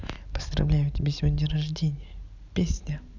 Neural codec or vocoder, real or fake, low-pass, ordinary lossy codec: none; real; 7.2 kHz; none